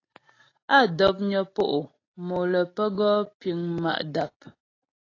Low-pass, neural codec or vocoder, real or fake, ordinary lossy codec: 7.2 kHz; none; real; AAC, 32 kbps